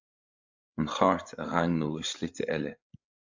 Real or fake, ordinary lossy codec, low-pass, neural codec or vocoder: fake; AAC, 48 kbps; 7.2 kHz; codec, 16 kHz, 16 kbps, FreqCodec, larger model